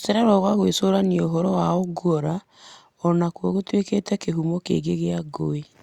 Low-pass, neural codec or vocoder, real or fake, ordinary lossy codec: 19.8 kHz; none; real; Opus, 64 kbps